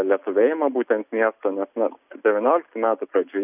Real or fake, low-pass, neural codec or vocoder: real; 3.6 kHz; none